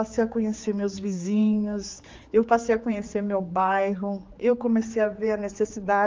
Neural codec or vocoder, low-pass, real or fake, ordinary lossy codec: codec, 16 kHz, 4 kbps, X-Codec, HuBERT features, trained on general audio; 7.2 kHz; fake; Opus, 32 kbps